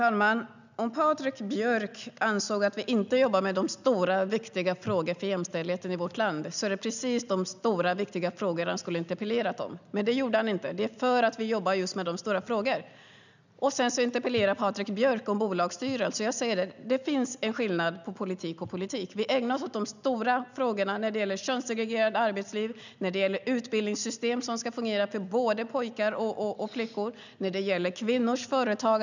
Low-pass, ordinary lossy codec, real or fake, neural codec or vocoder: 7.2 kHz; none; real; none